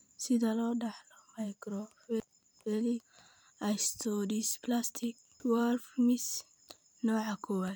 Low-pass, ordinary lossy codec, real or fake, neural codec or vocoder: none; none; fake; vocoder, 44.1 kHz, 128 mel bands every 512 samples, BigVGAN v2